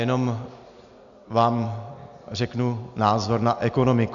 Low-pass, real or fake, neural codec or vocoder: 7.2 kHz; real; none